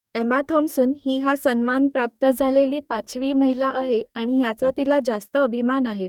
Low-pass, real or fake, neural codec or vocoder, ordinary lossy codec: 19.8 kHz; fake; codec, 44.1 kHz, 2.6 kbps, DAC; none